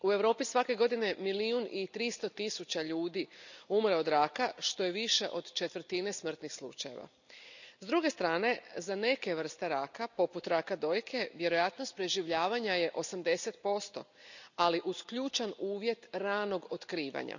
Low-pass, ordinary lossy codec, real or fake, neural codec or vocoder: 7.2 kHz; none; real; none